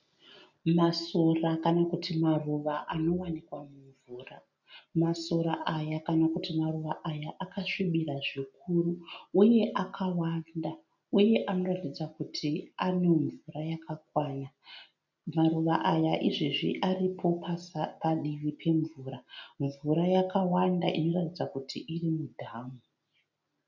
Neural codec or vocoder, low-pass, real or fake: none; 7.2 kHz; real